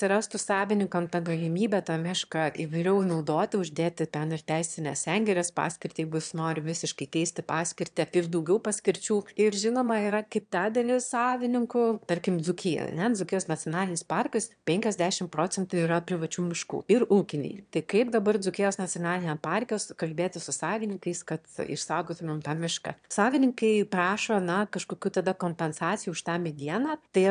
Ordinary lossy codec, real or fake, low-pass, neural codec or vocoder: AAC, 96 kbps; fake; 9.9 kHz; autoencoder, 22.05 kHz, a latent of 192 numbers a frame, VITS, trained on one speaker